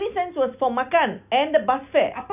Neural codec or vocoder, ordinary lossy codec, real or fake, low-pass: none; none; real; 3.6 kHz